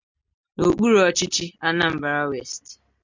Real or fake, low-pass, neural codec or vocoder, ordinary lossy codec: real; 7.2 kHz; none; MP3, 64 kbps